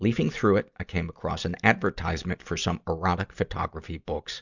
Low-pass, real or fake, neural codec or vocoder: 7.2 kHz; real; none